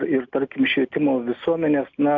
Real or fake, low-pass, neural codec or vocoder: real; 7.2 kHz; none